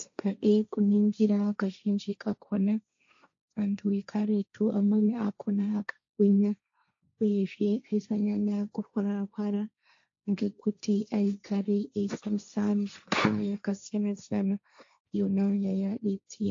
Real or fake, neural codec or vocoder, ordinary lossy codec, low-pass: fake; codec, 16 kHz, 1.1 kbps, Voila-Tokenizer; AAC, 48 kbps; 7.2 kHz